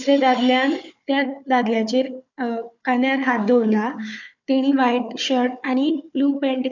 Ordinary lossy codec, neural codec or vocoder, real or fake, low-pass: none; codec, 16 kHz, 4 kbps, FunCodec, trained on Chinese and English, 50 frames a second; fake; 7.2 kHz